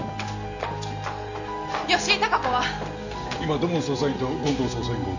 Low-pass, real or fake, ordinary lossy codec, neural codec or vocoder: 7.2 kHz; real; none; none